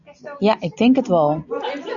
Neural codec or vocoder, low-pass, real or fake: none; 7.2 kHz; real